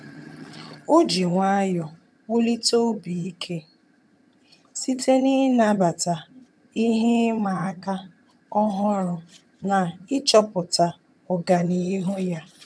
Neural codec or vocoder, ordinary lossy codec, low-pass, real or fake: vocoder, 22.05 kHz, 80 mel bands, HiFi-GAN; none; none; fake